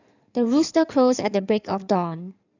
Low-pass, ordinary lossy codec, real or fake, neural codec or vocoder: 7.2 kHz; none; fake; codec, 16 kHz in and 24 kHz out, 2.2 kbps, FireRedTTS-2 codec